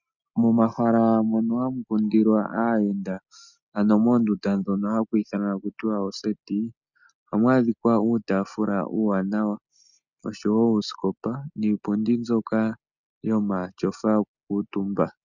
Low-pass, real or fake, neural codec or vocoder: 7.2 kHz; real; none